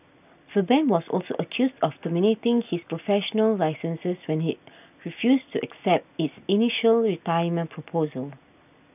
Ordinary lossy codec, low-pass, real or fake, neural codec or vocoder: none; 3.6 kHz; real; none